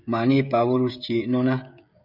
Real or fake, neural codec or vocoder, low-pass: fake; codec, 16 kHz, 16 kbps, FreqCodec, smaller model; 5.4 kHz